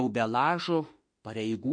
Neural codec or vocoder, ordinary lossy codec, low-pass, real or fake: autoencoder, 48 kHz, 32 numbers a frame, DAC-VAE, trained on Japanese speech; MP3, 48 kbps; 9.9 kHz; fake